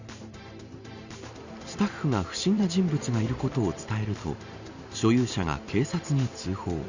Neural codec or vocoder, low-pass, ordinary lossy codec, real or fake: vocoder, 44.1 kHz, 128 mel bands every 512 samples, BigVGAN v2; 7.2 kHz; Opus, 64 kbps; fake